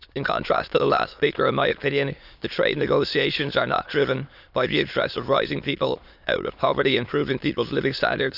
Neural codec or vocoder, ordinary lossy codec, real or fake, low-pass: autoencoder, 22.05 kHz, a latent of 192 numbers a frame, VITS, trained on many speakers; none; fake; 5.4 kHz